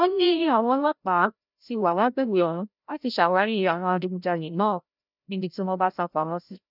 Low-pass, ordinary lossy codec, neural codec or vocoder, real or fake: 5.4 kHz; none; codec, 16 kHz, 0.5 kbps, FreqCodec, larger model; fake